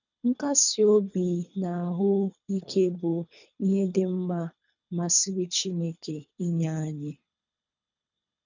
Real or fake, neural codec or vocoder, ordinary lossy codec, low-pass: fake; codec, 24 kHz, 3 kbps, HILCodec; none; 7.2 kHz